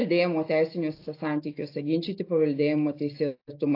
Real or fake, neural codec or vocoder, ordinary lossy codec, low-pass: real; none; AAC, 32 kbps; 5.4 kHz